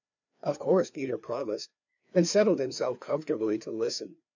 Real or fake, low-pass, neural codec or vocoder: fake; 7.2 kHz; codec, 16 kHz, 2 kbps, FreqCodec, larger model